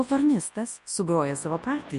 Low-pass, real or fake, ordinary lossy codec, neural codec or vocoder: 10.8 kHz; fake; MP3, 64 kbps; codec, 24 kHz, 0.9 kbps, WavTokenizer, large speech release